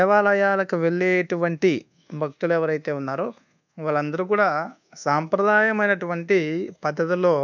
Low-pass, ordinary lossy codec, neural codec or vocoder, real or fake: 7.2 kHz; none; codec, 24 kHz, 1.2 kbps, DualCodec; fake